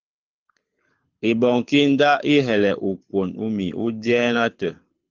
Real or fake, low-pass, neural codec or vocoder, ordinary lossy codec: fake; 7.2 kHz; codec, 24 kHz, 6 kbps, HILCodec; Opus, 24 kbps